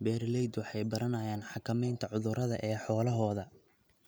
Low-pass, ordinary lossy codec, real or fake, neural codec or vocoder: none; none; real; none